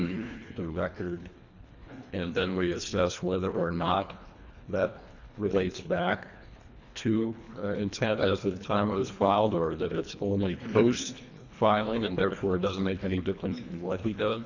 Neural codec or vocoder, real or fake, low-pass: codec, 24 kHz, 1.5 kbps, HILCodec; fake; 7.2 kHz